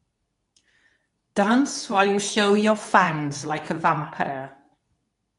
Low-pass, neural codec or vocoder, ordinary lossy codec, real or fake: 10.8 kHz; codec, 24 kHz, 0.9 kbps, WavTokenizer, medium speech release version 2; none; fake